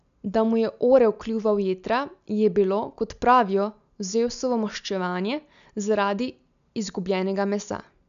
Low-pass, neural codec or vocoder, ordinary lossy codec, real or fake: 7.2 kHz; none; none; real